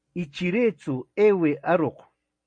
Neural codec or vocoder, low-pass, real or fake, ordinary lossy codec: none; 9.9 kHz; real; MP3, 48 kbps